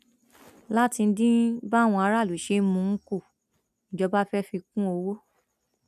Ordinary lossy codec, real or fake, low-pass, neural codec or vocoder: none; real; 14.4 kHz; none